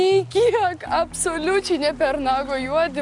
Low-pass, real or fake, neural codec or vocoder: 10.8 kHz; real; none